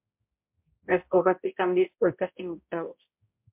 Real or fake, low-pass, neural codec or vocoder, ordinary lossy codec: fake; 3.6 kHz; codec, 16 kHz, 0.5 kbps, X-Codec, HuBERT features, trained on balanced general audio; MP3, 32 kbps